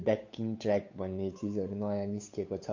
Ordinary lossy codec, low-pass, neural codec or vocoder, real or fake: MP3, 48 kbps; 7.2 kHz; codec, 16 kHz, 8 kbps, FunCodec, trained on Chinese and English, 25 frames a second; fake